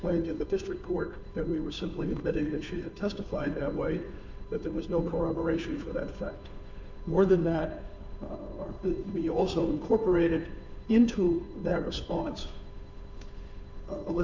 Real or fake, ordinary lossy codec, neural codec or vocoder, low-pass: fake; MP3, 64 kbps; codec, 16 kHz, 2 kbps, FunCodec, trained on Chinese and English, 25 frames a second; 7.2 kHz